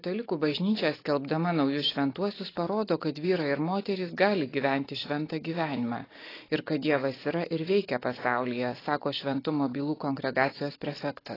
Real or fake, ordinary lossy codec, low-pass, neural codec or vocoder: real; AAC, 24 kbps; 5.4 kHz; none